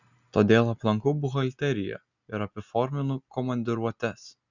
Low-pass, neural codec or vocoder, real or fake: 7.2 kHz; none; real